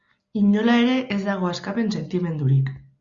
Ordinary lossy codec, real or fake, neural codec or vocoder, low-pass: Opus, 64 kbps; real; none; 7.2 kHz